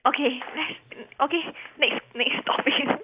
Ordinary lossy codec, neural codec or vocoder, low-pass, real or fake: Opus, 64 kbps; none; 3.6 kHz; real